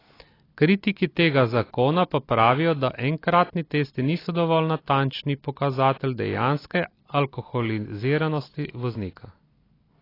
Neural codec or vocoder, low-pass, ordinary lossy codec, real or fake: none; 5.4 kHz; AAC, 24 kbps; real